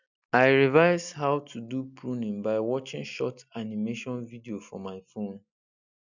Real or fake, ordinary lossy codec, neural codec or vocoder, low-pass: real; none; none; 7.2 kHz